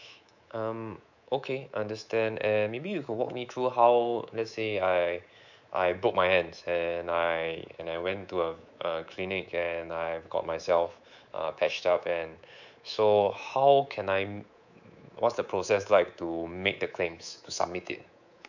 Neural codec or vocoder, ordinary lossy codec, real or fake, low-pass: codec, 24 kHz, 3.1 kbps, DualCodec; none; fake; 7.2 kHz